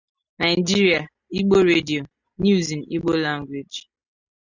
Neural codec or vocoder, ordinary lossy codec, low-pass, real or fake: none; Opus, 64 kbps; 7.2 kHz; real